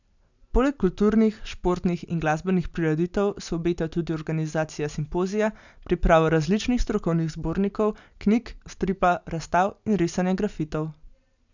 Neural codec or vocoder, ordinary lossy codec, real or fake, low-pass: none; none; real; 7.2 kHz